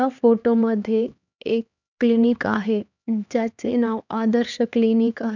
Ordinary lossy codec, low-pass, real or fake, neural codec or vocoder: none; 7.2 kHz; fake; codec, 16 kHz, 2 kbps, X-Codec, HuBERT features, trained on LibriSpeech